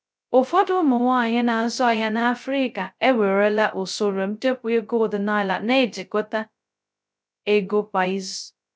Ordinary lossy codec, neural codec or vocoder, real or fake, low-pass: none; codec, 16 kHz, 0.2 kbps, FocalCodec; fake; none